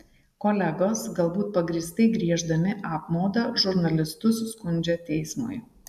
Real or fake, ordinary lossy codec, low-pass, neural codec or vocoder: real; AAC, 96 kbps; 14.4 kHz; none